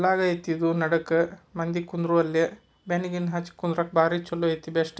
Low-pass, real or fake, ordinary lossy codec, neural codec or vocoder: none; real; none; none